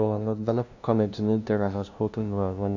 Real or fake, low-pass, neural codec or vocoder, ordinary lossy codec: fake; 7.2 kHz; codec, 16 kHz, 0.5 kbps, FunCodec, trained on LibriTTS, 25 frames a second; none